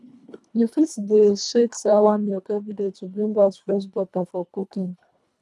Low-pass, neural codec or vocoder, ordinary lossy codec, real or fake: none; codec, 24 kHz, 1.5 kbps, HILCodec; none; fake